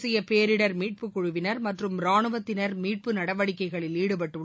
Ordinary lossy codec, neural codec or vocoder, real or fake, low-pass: none; none; real; none